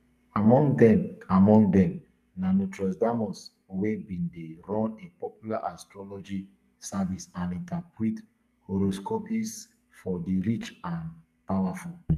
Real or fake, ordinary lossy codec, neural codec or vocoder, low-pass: fake; none; codec, 44.1 kHz, 2.6 kbps, SNAC; 14.4 kHz